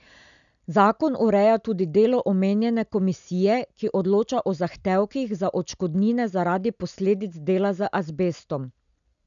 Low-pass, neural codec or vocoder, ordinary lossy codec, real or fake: 7.2 kHz; none; none; real